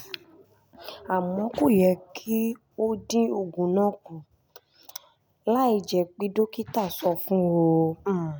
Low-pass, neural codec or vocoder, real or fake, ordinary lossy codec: none; none; real; none